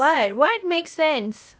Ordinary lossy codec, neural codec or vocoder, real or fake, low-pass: none; codec, 16 kHz, 0.8 kbps, ZipCodec; fake; none